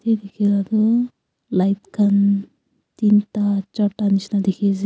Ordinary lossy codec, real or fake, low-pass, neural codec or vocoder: none; real; none; none